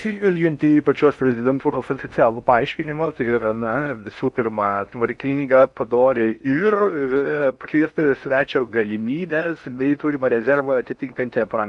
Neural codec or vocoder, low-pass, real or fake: codec, 16 kHz in and 24 kHz out, 0.6 kbps, FocalCodec, streaming, 4096 codes; 10.8 kHz; fake